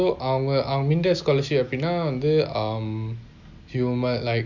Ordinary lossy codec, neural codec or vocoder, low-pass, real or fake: none; none; 7.2 kHz; real